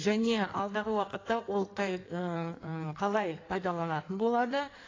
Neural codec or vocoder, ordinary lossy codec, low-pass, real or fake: codec, 16 kHz in and 24 kHz out, 1.1 kbps, FireRedTTS-2 codec; AAC, 32 kbps; 7.2 kHz; fake